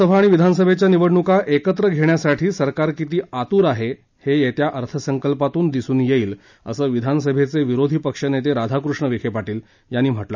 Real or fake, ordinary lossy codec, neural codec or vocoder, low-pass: real; none; none; 7.2 kHz